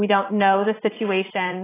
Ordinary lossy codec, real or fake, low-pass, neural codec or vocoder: AAC, 16 kbps; fake; 3.6 kHz; vocoder, 44.1 kHz, 80 mel bands, Vocos